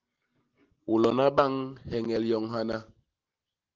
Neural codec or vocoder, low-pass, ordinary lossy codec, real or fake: none; 7.2 kHz; Opus, 16 kbps; real